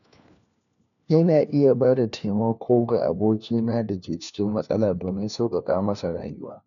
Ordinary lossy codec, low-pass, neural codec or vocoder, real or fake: none; 7.2 kHz; codec, 16 kHz, 1 kbps, FunCodec, trained on LibriTTS, 50 frames a second; fake